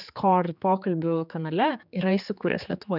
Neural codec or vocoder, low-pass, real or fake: codec, 16 kHz, 4 kbps, X-Codec, HuBERT features, trained on general audio; 5.4 kHz; fake